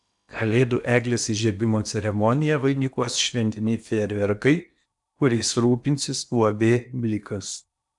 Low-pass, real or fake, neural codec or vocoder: 10.8 kHz; fake; codec, 16 kHz in and 24 kHz out, 0.8 kbps, FocalCodec, streaming, 65536 codes